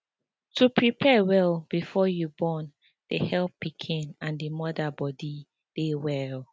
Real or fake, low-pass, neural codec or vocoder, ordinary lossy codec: real; none; none; none